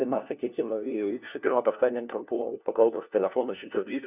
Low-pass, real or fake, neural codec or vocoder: 3.6 kHz; fake; codec, 16 kHz, 1 kbps, FunCodec, trained on LibriTTS, 50 frames a second